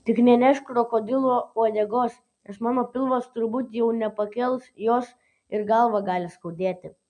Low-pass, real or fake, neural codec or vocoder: 10.8 kHz; real; none